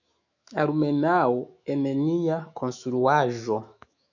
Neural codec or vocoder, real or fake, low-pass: autoencoder, 48 kHz, 128 numbers a frame, DAC-VAE, trained on Japanese speech; fake; 7.2 kHz